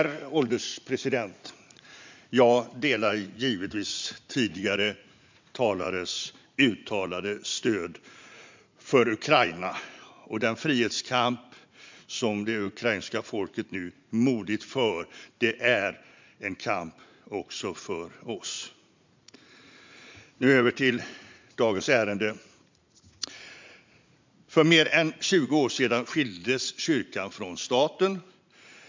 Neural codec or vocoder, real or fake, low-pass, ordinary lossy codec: vocoder, 44.1 kHz, 80 mel bands, Vocos; fake; 7.2 kHz; MP3, 64 kbps